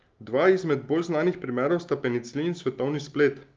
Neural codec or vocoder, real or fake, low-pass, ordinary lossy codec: none; real; 7.2 kHz; Opus, 24 kbps